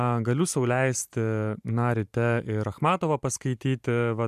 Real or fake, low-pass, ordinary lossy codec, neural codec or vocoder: fake; 14.4 kHz; MP3, 96 kbps; vocoder, 44.1 kHz, 128 mel bands every 512 samples, BigVGAN v2